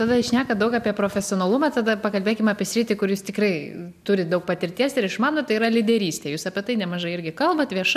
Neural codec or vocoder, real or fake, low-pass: none; real; 14.4 kHz